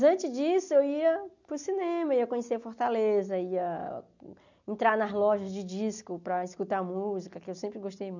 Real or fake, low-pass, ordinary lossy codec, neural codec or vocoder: real; 7.2 kHz; none; none